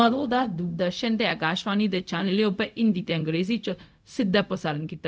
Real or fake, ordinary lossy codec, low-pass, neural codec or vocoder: fake; none; none; codec, 16 kHz, 0.4 kbps, LongCat-Audio-Codec